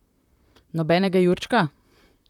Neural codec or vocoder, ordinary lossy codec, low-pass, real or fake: vocoder, 44.1 kHz, 128 mel bands, Pupu-Vocoder; none; 19.8 kHz; fake